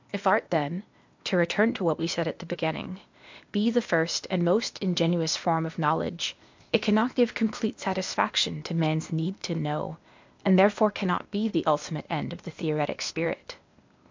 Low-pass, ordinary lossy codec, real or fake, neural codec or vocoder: 7.2 kHz; MP3, 64 kbps; fake; codec, 16 kHz, 0.8 kbps, ZipCodec